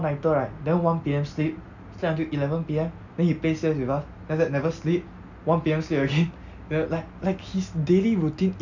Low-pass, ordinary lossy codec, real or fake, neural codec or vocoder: 7.2 kHz; none; real; none